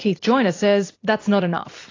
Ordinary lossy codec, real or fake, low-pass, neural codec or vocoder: AAC, 32 kbps; fake; 7.2 kHz; vocoder, 44.1 kHz, 128 mel bands every 256 samples, BigVGAN v2